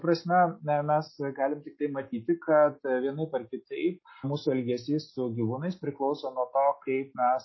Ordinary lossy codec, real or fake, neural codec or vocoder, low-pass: MP3, 24 kbps; real; none; 7.2 kHz